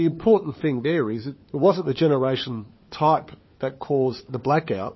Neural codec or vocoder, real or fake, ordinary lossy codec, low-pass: codec, 16 kHz, 4 kbps, FunCodec, trained on LibriTTS, 50 frames a second; fake; MP3, 24 kbps; 7.2 kHz